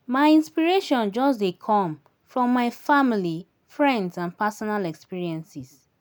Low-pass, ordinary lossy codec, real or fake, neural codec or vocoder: none; none; real; none